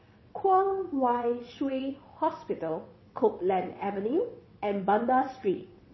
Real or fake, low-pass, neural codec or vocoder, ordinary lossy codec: fake; 7.2 kHz; vocoder, 22.05 kHz, 80 mel bands, WaveNeXt; MP3, 24 kbps